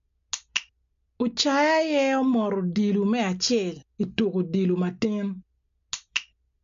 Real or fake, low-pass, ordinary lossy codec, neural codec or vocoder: real; 7.2 kHz; MP3, 48 kbps; none